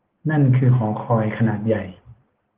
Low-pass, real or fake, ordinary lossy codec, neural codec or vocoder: 3.6 kHz; real; Opus, 16 kbps; none